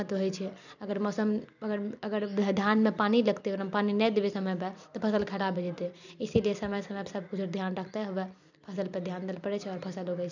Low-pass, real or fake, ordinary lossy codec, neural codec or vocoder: 7.2 kHz; fake; none; vocoder, 44.1 kHz, 128 mel bands every 256 samples, BigVGAN v2